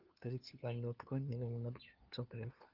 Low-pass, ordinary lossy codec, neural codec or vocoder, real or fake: 5.4 kHz; Opus, 32 kbps; codec, 16 kHz, 2 kbps, FunCodec, trained on LibriTTS, 25 frames a second; fake